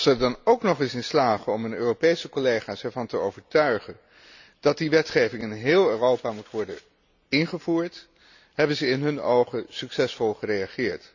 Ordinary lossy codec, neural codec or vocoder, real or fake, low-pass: none; none; real; 7.2 kHz